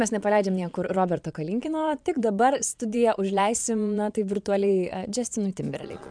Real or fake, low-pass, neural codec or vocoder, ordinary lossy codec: fake; 9.9 kHz; vocoder, 22.05 kHz, 80 mel bands, Vocos; MP3, 96 kbps